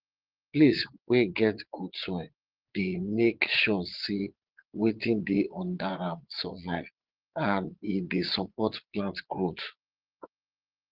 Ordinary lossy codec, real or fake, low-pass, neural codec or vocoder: Opus, 16 kbps; fake; 5.4 kHz; vocoder, 22.05 kHz, 80 mel bands, Vocos